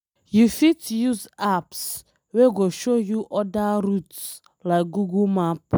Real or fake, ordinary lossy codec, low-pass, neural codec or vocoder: real; none; none; none